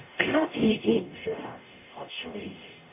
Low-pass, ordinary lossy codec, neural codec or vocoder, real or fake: 3.6 kHz; none; codec, 44.1 kHz, 0.9 kbps, DAC; fake